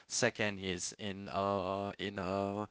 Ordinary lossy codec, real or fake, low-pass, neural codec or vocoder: none; fake; none; codec, 16 kHz, 0.8 kbps, ZipCodec